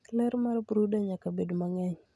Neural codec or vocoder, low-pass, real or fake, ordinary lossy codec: none; none; real; none